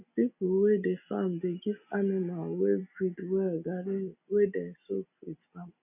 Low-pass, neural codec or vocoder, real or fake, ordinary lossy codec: 3.6 kHz; none; real; none